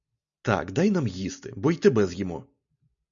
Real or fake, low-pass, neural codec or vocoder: real; 7.2 kHz; none